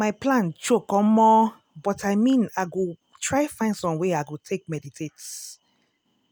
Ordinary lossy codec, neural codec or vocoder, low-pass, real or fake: none; none; none; real